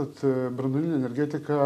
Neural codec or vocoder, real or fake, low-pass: none; real; 14.4 kHz